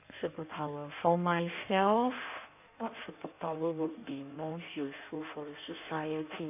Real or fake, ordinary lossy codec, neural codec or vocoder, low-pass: fake; none; codec, 16 kHz in and 24 kHz out, 1.1 kbps, FireRedTTS-2 codec; 3.6 kHz